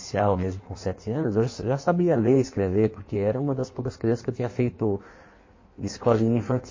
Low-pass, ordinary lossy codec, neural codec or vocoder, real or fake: 7.2 kHz; MP3, 32 kbps; codec, 16 kHz in and 24 kHz out, 1.1 kbps, FireRedTTS-2 codec; fake